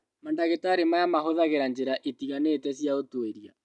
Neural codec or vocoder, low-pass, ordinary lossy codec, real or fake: none; 10.8 kHz; none; real